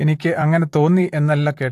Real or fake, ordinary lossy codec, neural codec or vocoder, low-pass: fake; AAC, 64 kbps; vocoder, 44.1 kHz, 128 mel bands, Pupu-Vocoder; 14.4 kHz